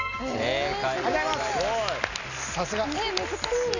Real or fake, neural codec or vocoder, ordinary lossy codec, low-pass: real; none; none; 7.2 kHz